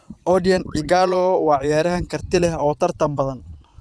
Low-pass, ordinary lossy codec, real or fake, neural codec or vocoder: none; none; fake; vocoder, 22.05 kHz, 80 mel bands, Vocos